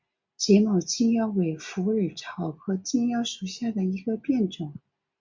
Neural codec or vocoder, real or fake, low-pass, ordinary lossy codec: none; real; 7.2 kHz; MP3, 64 kbps